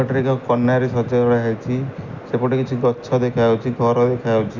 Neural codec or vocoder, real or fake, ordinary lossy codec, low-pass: none; real; none; 7.2 kHz